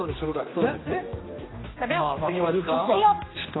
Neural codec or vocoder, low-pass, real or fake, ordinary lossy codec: codec, 16 kHz, 2 kbps, X-Codec, HuBERT features, trained on general audio; 7.2 kHz; fake; AAC, 16 kbps